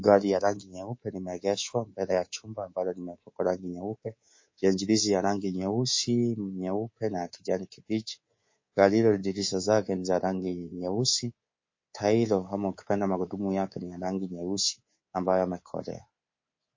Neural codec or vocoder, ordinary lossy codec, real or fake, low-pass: codec, 24 kHz, 3.1 kbps, DualCodec; MP3, 32 kbps; fake; 7.2 kHz